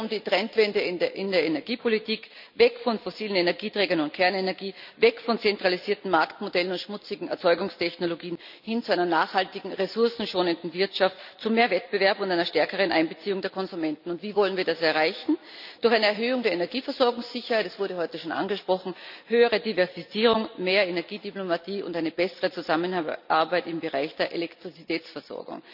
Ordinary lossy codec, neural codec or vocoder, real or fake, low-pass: none; none; real; 5.4 kHz